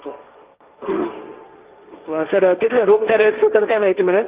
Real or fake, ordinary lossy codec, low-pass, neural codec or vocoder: fake; Opus, 32 kbps; 3.6 kHz; codec, 16 kHz, 1.1 kbps, Voila-Tokenizer